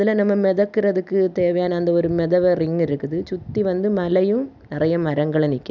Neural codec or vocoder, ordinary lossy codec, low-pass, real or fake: none; none; 7.2 kHz; real